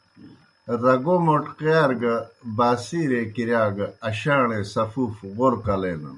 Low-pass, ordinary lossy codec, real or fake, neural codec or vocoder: 10.8 kHz; MP3, 96 kbps; real; none